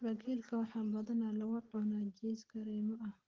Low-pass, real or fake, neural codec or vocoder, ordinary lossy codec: 7.2 kHz; real; none; Opus, 16 kbps